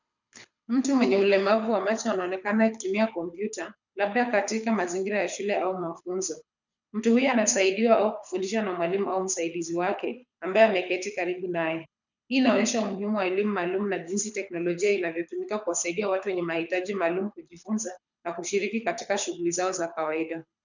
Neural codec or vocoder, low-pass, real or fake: codec, 24 kHz, 6 kbps, HILCodec; 7.2 kHz; fake